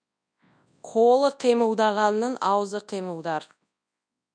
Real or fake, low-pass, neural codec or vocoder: fake; 9.9 kHz; codec, 24 kHz, 0.9 kbps, WavTokenizer, large speech release